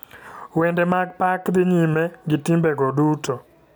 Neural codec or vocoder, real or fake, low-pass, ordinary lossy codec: none; real; none; none